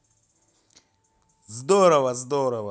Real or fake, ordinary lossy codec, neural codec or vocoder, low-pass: real; none; none; none